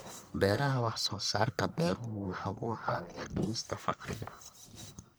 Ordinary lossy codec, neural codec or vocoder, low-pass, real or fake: none; codec, 44.1 kHz, 1.7 kbps, Pupu-Codec; none; fake